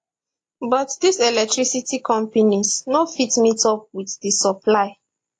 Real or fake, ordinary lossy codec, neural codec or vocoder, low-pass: real; AAC, 48 kbps; none; 9.9 kHz